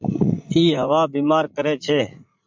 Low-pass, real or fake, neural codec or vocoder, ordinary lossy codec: 7.2 kHz; fake; vocoder, 44.1 kHz, 80 mel bands, Vocos; MP3, 64 kbps